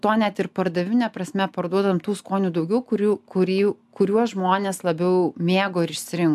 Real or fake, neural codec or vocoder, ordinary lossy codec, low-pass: real; none; AAC, 96 kbps; 14.4 kHz